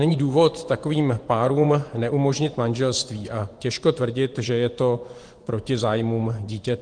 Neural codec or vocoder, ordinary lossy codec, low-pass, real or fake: vocoder, 24 kHz, 100 mel bands, Vocos; Opus, 24 kbps; 9.9 kHz; fake